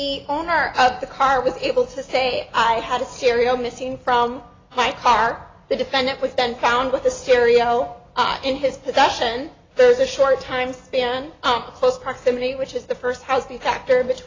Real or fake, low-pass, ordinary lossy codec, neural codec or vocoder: real; 7.2 kHz; AAC, 32 kbps; none